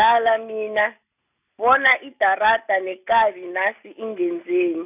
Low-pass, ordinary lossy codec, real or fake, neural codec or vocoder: 3.6 kHz; none; real; none